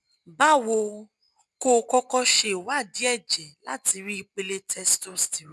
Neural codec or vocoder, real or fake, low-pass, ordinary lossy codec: none; real; none; none